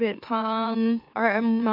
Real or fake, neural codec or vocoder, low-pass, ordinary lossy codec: fake; autoencoder, 44.1 kHz, a latent of 192 numbers a frame, MeloTTS; 5.4 kHz; none